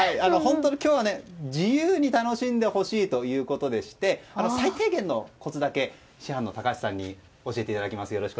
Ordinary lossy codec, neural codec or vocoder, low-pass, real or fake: none; none; none; real